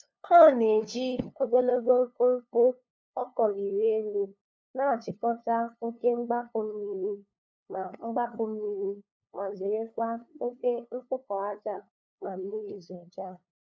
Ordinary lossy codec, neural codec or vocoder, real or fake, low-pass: none; codec, 16 kHz, 2 kbps, FunCodec, trained on LibriTTS, 25 frames a second; fake; none